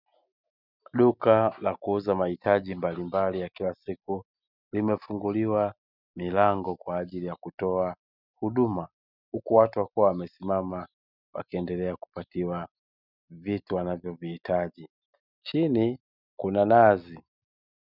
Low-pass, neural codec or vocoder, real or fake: 5.4 kHz; none; real